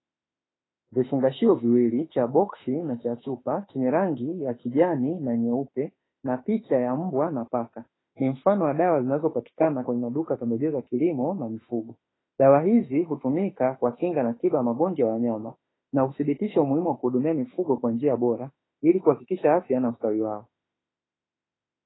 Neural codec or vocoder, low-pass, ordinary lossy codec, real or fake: autoencoder, 48 kHz, 32 numbers a frame, DAC-VAE, trained on Japanese speech; 7.2 kHz; AAC, 16 kbps; fake